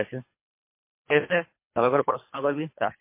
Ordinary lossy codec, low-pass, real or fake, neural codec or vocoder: MP3, 24 kbps; 3.6 kHz; fake; codec, 24 kHz, 3 kbps, HILCodec